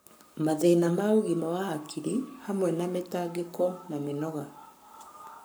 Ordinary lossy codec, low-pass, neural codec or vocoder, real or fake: none; none; codec, 44.1 kHz, 7.8 kbps, Pupu-Codec; fake